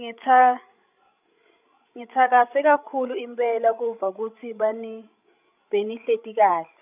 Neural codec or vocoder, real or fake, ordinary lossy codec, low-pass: codec, 16 kHz, 16 kbps, FreqCodec, larger model; fake; none; 3.6 kHz